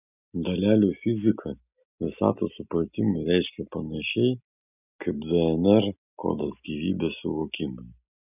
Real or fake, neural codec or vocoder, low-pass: real; none; 3.6 kHz